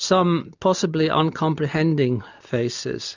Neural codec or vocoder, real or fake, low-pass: none; real; 7.2 kHz